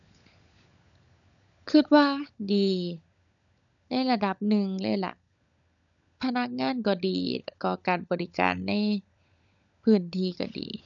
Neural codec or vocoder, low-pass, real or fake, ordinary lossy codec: codec, 16 kHz, 16 kbps, FunCodec, trained on LibriTTS, 50 frames a second; 7.2 kHz; fake; none